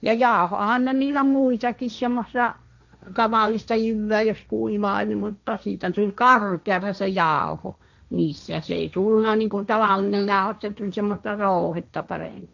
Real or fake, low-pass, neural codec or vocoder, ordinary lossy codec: fake; 7.2 kHz; codec, 16 kHz, 1.1 kbps, Voila-Tokenizer; none